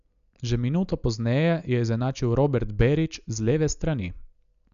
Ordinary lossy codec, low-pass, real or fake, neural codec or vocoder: none; 7.2 kHz; real; none